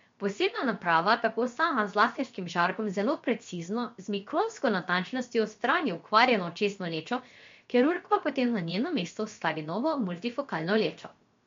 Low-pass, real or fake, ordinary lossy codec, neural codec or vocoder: 7.2 kHz; fake; MP3, 48 kbps; codec, 16 kHz, 0.7 kbps, FocalCodec